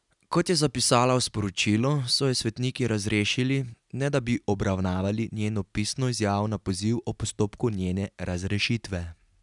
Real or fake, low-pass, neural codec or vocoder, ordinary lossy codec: real; 10.8 kHz; none; none